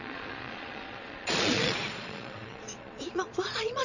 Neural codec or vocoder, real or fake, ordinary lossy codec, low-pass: vocoder, 22.05 kHz, 80 mel bands, Vocos; fake; none; 7.2 kHz